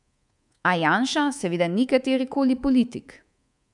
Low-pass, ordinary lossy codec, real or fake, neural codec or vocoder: 10.8 kHz; none; fake; codec, 24 kHz, 3.1 kbps, DualCodec